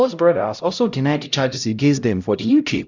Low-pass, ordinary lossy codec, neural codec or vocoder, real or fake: 7.2 kHz; none; codec, 16 kHz, 0.5 kbps, X-Codec, HuBERT features, trained on LibriSpeech; fake